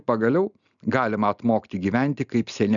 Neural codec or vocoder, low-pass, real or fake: none; 7.2 kHz; real